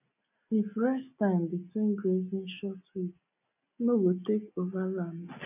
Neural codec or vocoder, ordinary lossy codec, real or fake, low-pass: none; none; real; 3.6 kHz